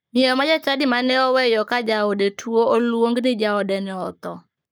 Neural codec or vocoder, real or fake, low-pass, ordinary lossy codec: codec, 44.1 kHz, 7.8 kbps, Pupu-Codec; fake; none; none